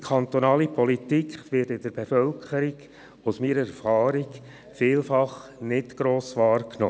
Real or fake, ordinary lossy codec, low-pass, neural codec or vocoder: real; none; none; none